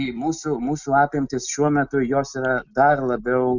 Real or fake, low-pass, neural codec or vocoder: real; 7.2 kHz; none